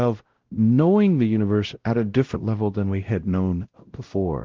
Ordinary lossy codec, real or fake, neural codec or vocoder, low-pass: Opus, 16 kbps; fake; codec, 16 kHz, 0.5 kbps, X-Codec, WavLM features, trained on Multilingual LibriSpeech; 7.2 kHz